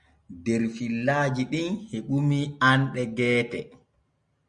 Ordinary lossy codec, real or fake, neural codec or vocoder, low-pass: Opus, 64 kbps; real; none; 9.9 kHz